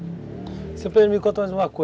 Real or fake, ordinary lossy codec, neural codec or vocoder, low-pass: real; none; none; none